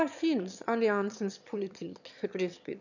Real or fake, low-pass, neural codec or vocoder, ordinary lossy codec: fake; 7.2 kHz; autoencoder, 22.05 kHz, a latent of 192 numbers a frame, VITS, trained on one speaker; none